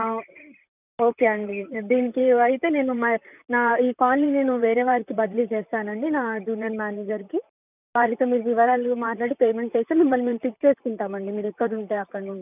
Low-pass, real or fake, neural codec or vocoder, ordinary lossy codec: 3.6 kHz; fake; vocoder, 44.1 kHz, 128 mel bands, Pupu-Vocoder; none